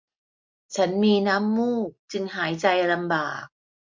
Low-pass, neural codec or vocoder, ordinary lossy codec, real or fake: 7.2 kHz; none; MP3, 48 kbps; real